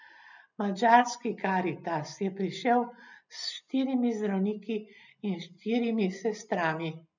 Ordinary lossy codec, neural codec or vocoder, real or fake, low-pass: none; none; real; 7.2 kHz